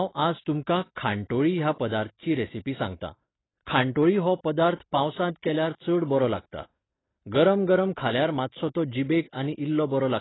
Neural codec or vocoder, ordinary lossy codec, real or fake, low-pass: none; AAC, 16 kbps; real; 7.2 kHz